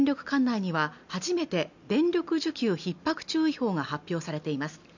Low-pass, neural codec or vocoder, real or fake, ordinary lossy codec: 7.2 kHz; none; real; none